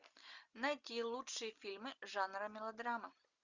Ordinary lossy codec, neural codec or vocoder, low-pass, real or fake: Opus, 64 kbps; none; 7.2 kHz; real